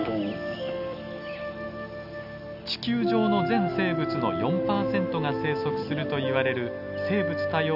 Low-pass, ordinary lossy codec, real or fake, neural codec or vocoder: 5.4 kHz; none; real; none